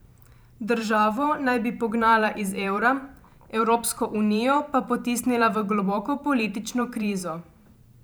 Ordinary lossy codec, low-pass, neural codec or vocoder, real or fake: none; none; vocoder, 44.1 kHz, 128 mel bands every 512 samples, BigVGAN v2; fake